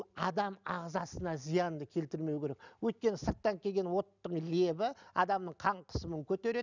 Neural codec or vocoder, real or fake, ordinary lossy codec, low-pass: none; real; none; 7.2 kHz